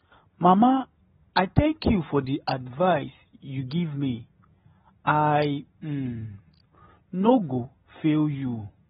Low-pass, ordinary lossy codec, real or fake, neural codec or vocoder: 19.8 kHz; AAC, 16 kbps; real; none